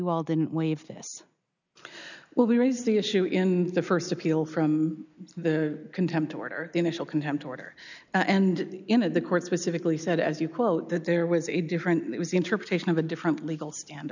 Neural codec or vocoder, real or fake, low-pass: none; real; 7.2 kHz